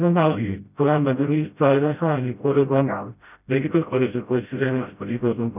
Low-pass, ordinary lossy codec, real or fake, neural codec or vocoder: 3.6 kHz; none; fake; codec, 16 kHz, 0.5 kbps, FreqCodec, smaller model